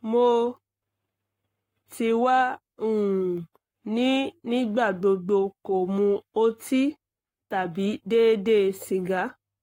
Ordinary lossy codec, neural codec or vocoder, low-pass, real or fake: AAC, 48 kbps; codec, 44.1 kHz, 7.8 kbps, Pupu-Codec; 19.8 kHz; fake